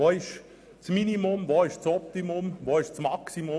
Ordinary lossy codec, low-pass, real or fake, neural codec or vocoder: none; none; real; none